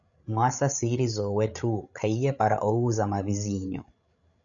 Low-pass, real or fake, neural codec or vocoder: 7.2 kHz; fake; codec, 16 kHz, 16 kbps, FreqCodec, larger model